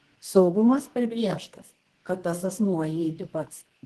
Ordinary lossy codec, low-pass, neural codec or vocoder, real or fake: Opus, 16 kbps; 10.8 kHz; codec, 24 kHz, 0.9 kbps, WavTokenizer, medium music audio release; fake